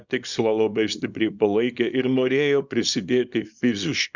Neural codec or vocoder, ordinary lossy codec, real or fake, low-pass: codec, 24 kHz, 0.9 kbps, WavTokenizer, small release; Opus, 64 kbps; fake; 7.2 kHz